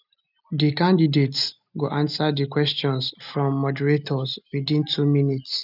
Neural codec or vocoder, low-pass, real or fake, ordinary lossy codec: none; 5.4 kHz; real; none